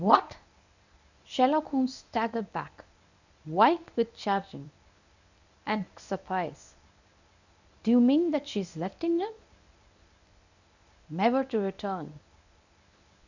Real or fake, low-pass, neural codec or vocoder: fake; 7.2 kHz; codec, 24 kHz, 0.9 kbps, WavTokenizer, medium speech release version 1